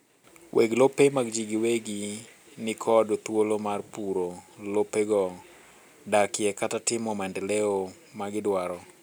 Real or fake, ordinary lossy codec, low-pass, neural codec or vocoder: real; none; none; none